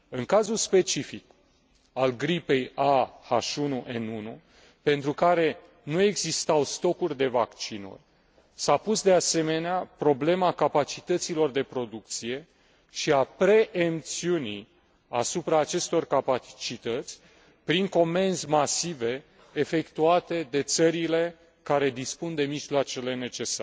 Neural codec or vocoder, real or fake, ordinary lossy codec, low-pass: none; real; none; none